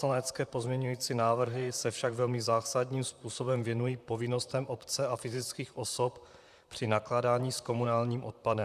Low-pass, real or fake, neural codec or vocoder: 14.4 kHz; fake; vocoder, 44.1 kHz, 128 mel bands, Pupu-Vocoder